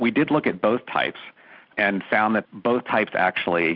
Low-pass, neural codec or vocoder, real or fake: 5.4 kHz; none; real